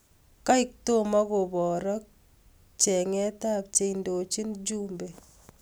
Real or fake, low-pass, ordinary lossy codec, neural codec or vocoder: real; none; none; none